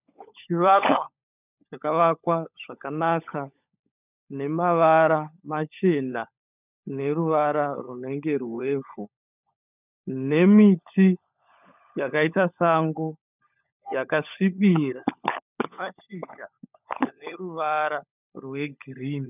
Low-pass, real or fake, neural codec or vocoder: 3.6 kHz; fake; codec, 16 kHz, 16 kbps, FunCodec, trained on LibriTTS, 50 frames a second